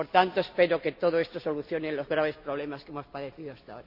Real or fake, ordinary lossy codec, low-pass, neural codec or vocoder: real; none; 5.4 kHz; none